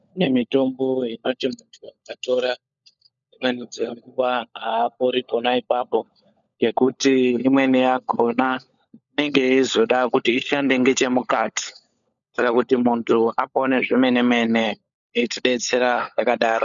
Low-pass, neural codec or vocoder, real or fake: 7.2 kHz; codec, 16 kHz, 16 kbps, FunCodec, trained on LibriTTS, 50 frames a second; fake